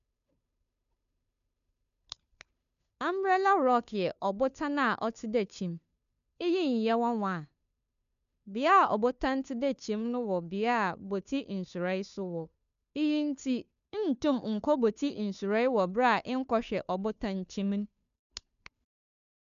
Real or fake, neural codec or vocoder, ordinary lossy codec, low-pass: fake; codec, 16 kHz, 2 kbps, FunCodec, trained on Chinese and English, 25 frames a second; none; 7.2 kHz